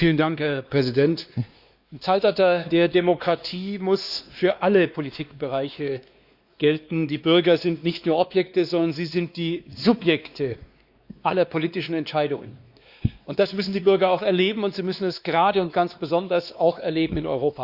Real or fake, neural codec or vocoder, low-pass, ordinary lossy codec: fake; codec, 16 kHz, 2 kbps, X-Codec, WavLM features, trained on Multilingual LibriSpeech; 5.4 kHz; Opus, 64 kbps